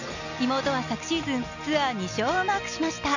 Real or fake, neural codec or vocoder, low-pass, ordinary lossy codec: real; none; 7.2 kHz; none